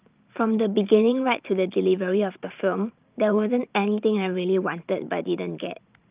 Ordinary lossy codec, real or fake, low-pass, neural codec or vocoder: Opus, 24 kbps; fake; 3.6 kHz; vocoder, 44.1 kHz, 128 mel bands every 512 samples, BigVGAN v2